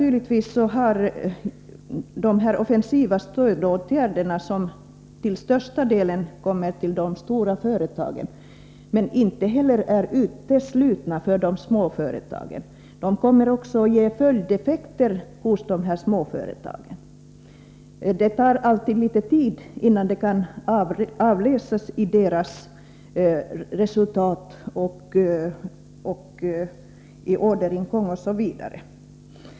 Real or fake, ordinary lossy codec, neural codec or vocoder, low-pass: real; none; none; none